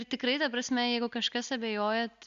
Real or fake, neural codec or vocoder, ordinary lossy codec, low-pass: real; none; Opus, 64 kbps; 7.2 kHz